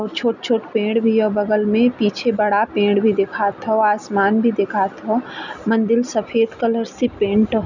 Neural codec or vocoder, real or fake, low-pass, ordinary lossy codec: none; real; 7.2 kHz; none